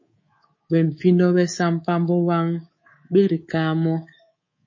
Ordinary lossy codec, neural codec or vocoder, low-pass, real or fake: MP3, 32 kbps; codec, 24 kHz, 3.1 kbps, DualCodec; 7.2 kHz; fake